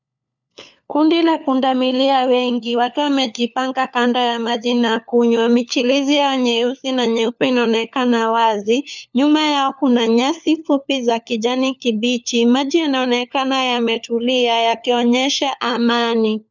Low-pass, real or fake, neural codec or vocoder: 7.2 kHz; fake; codec, 16 kHz, 4 kbps, FunCodec, trained on LibriTTS, 50 frames a second